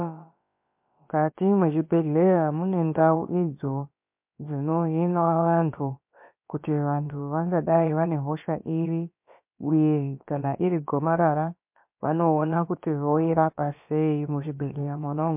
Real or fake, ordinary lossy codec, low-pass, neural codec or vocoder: fake; MP3, 32 kbps; 3.6 kHz; codec, 16 kHz, about 1 kbps, DyCAST, with the encoder's durations